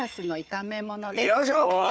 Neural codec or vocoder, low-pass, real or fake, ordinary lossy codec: codec, 16 kHz, 4 kbps, FunCodec, trained on Chinese and English, 50 frames a second; none; fake; none